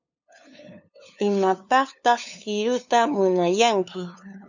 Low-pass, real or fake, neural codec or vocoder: 7.2 kHz; fake; codec, 16 kHz, 2 kbps, FunCodec, trained on LibriTTS, 25 frames a second